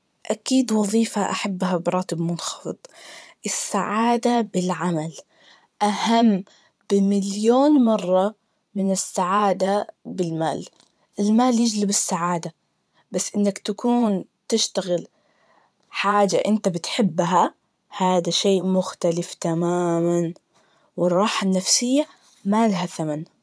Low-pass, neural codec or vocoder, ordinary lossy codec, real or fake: none; vocoder, 22.05 kHz, 80 mel bands, Vocos; none; fake